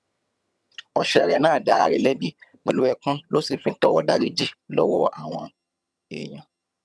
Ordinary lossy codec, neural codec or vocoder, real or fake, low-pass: none; vocoder, 22.05 kHz, 80 mel bands, HiFi-GAN; fake; none